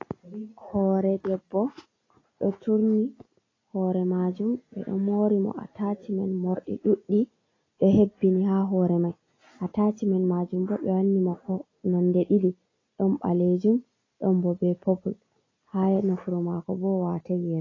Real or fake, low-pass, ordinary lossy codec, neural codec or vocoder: real; 7.2 kHz; AAC, 32 kbps; none